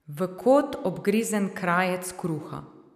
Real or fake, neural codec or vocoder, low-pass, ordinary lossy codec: real; none; 14.4 kHz; none